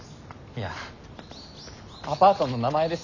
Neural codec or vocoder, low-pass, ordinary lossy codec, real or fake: none; 7.2 kHz; none; real